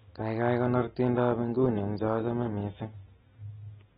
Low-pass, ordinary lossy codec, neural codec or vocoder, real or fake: 19.8 kHz; AAC, 16 kbps; none; real